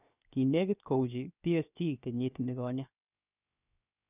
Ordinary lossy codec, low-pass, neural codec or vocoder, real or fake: none; 3.6 kHz; codec, 16 kHz, 0.7 kbps, FocalCodec; fake